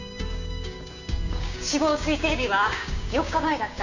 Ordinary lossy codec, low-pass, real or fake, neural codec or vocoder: none; 7.2 kHz; fake; codec, 16 kHz, 6 kbps, DAC